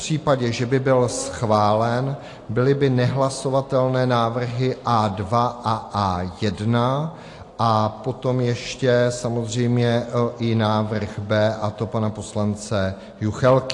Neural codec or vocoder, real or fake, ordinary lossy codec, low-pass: none; real; AAC, 48 kbps; 10.8 kHz